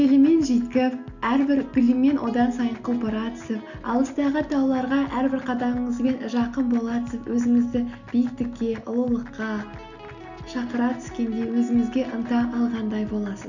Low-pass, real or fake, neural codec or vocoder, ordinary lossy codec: 7.2 kHz; real; none; none